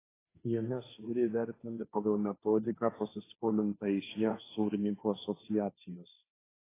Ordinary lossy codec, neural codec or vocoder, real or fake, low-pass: AAC, 16 kbps; codec, 16 kHz, 1.1 kbps, Voila-Tokenizer; fake; 3.6 kHz